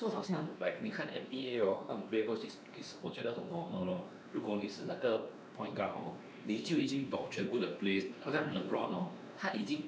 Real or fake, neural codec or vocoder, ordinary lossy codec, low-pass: fake; codec, 16 kHz, 2 kbps, X-Codec, WavLM features, trained on Multilingual LibriSpeech; none; none